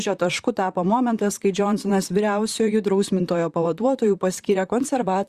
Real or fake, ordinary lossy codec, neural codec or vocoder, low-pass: fake; Opus, 64 kbps; vocoder, 44.1 kHz, 128 mel bands, Pupu-Vocoder; 14.4 kHz